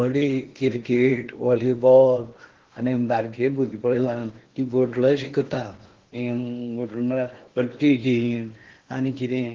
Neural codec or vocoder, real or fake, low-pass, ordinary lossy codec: codec, 16 kHz in and 24 kHz out, 0.8 kbps, FocalCodec, streaming, 65536 codes; fake; 7.2 kHz; Opus, 16 kbps